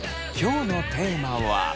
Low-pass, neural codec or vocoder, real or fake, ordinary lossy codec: none; none; real; none